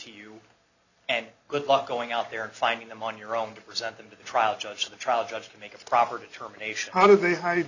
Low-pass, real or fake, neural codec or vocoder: 7.2 kHz; real; none